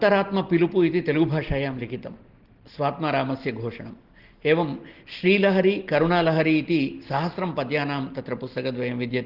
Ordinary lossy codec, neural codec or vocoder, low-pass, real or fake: Opus, 16 kbps; none; 5.4 kHz; real